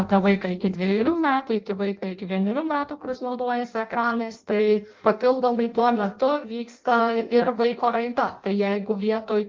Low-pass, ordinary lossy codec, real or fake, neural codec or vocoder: 7.2 kHz; Opus, 32 kbps; fake; codec, 16 kHz in and 24 kHz out, 0.6 kbps, FireRedTTS-2 codec